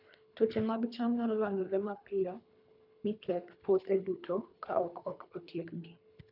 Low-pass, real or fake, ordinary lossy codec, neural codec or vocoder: 5.4 kHz; fake; none; codec, 24 kHz, 3 kbps, HILCodec